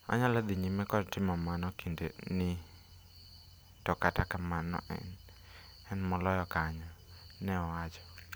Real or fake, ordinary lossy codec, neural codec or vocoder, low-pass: real; none; none; none